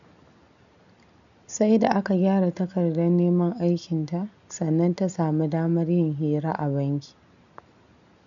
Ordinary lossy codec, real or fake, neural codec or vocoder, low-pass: none; real; none; 7.2 kHz